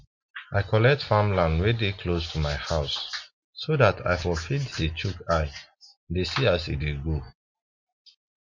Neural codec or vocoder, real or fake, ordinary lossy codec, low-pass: none; real; MP3, 48 kbps; 7.2 kHz